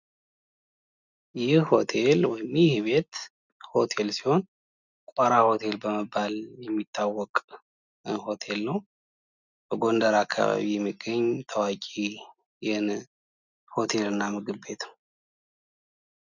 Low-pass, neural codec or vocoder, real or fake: 7.2 kHz; none; real